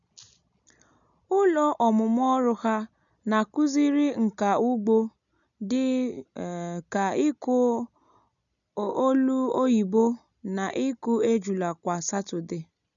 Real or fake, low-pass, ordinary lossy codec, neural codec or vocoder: real; 7.2 kHz; none; none